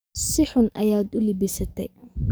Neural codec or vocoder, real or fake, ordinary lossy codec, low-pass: codec, 44.1 kHz, 7.8 kbps, DAC; fake; none; none